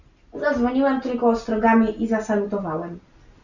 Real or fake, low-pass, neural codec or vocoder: real; 7.2 kHz; none